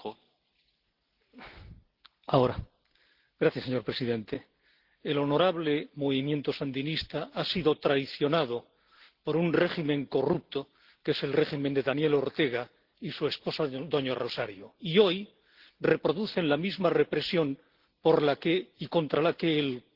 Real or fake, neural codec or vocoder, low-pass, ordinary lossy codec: real; none; 5.4 kHz; Opus, 16 kbps